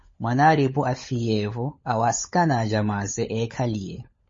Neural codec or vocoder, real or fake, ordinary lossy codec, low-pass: codec, 16 kHz, 16 kbps, FunCodec, trained on LibriTTS, 50 frames a second; fake; MP3, 32 kbps; 7.2 kHz